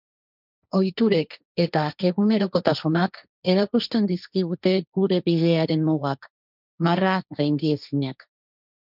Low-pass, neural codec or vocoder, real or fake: 5.4 kHz; codec, 16 kHz, 1.1 kbps, Voila-Tokenizer; fake